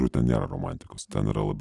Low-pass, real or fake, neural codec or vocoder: 10.8 kHz; real; none